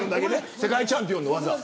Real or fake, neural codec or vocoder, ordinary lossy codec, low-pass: real; none; none; none